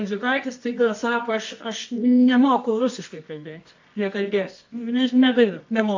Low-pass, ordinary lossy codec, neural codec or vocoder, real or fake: 7.2 kHz; AAC, 48 kbps; codec, 24 kHz, 0.9 kbps, WavTokenizer, medium music audio release; fake